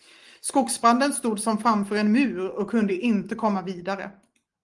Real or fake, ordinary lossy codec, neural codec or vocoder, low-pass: real; Opus, 24 kbps; none; 10.8 kHz